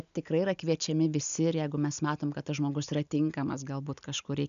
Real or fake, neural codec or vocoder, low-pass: real; none; 7.2 kHz